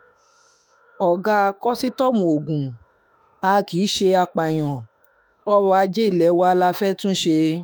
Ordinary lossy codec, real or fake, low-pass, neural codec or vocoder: none; fake; none; autoencoder, 48 kHz, 32 numbers a frame, DAC-VAE, trained on Japanese speech